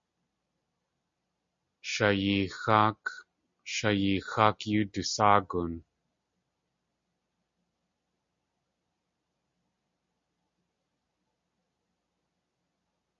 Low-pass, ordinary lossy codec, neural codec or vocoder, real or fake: 7.2 kHz; MP3, 48 kbps; none; real